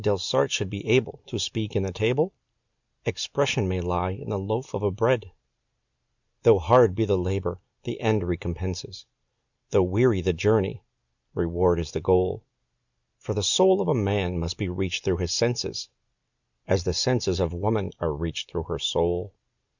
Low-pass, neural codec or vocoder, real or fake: 7.2 kHz; none; real